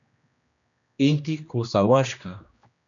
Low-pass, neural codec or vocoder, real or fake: 7.2 kHz; codec, 16 kHz, 1 kbps, X-Codec, HuBERT features, trained on general audio; fake